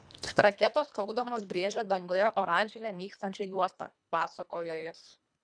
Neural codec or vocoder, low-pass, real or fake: codec, 24 kHz, 1.5 kbps, HILCodec; 9.9 kHz; fake